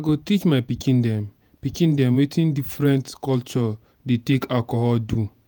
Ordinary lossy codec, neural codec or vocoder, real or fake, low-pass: none; vocoder, 48 kHz, 128 mel bands, Vocos; fake; none